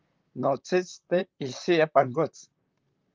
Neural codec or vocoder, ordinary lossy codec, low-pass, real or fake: vocoder, 44.1 kHz, 128 mel bands, Pupu-Vocoder; Opus, 32 kbps; 7.2 kHz; fake